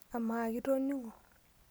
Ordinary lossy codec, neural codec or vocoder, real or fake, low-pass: none; none; real; none